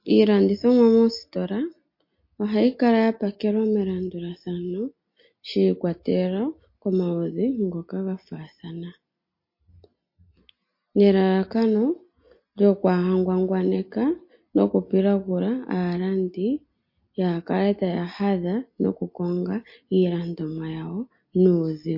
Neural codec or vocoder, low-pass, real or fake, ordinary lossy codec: none; 5.4 kHz; real; MP3, 32 kbps